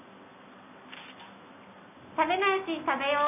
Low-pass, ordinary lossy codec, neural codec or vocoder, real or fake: 3.6 kHz; none; none; real